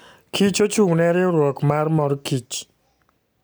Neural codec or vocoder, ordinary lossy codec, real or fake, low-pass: none; none; real; none